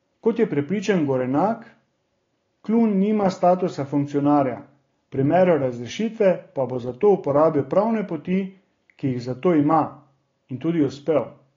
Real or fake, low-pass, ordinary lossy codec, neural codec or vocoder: real; 7.2 kHz; AAC, 32 kbps; none